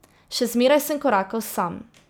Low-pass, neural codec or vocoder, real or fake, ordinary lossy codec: none; vocoder, 44.1 kHz, 128 mel bands every 256 samples, BigVGAN v2; fake; none